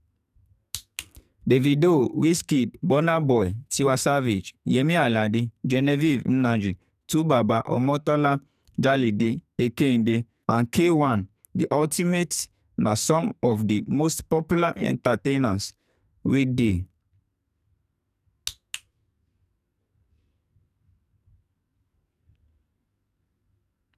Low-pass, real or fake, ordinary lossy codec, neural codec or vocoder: 14.4 kHz; fake; none; codec, 44.1 kHz, 2.6 kbps, SNAC